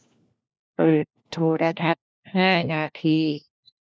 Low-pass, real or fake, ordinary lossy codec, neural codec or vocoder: none; fake; none; codec, 16 kHz, 1 kbps, FunCodec, trained on LibriTTS, 50 frames a second